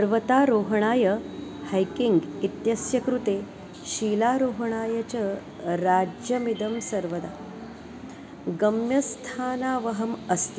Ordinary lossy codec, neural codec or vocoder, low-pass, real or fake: none; none; none; real